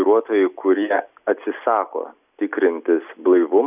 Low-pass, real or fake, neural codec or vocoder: 3.6 kHz; real; none